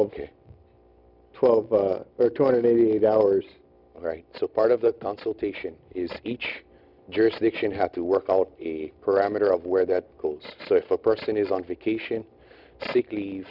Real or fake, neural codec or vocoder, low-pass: real; none; 5.4 kHz